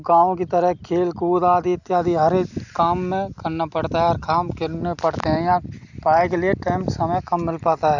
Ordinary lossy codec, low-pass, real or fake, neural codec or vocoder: none; 7.2 kHz; real; none